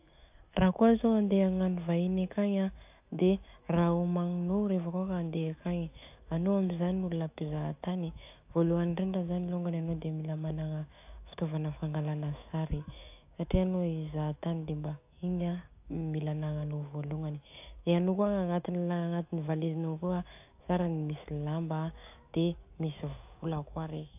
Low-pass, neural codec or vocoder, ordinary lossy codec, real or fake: 3.6 kHz; autoencoder, 48 kHz, 128 numbers a frame, DAC-VAE, trained on Japanese speech; AAC, 32 kbps; fake